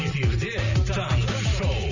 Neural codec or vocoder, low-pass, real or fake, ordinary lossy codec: none; 7.2 kHz; real; none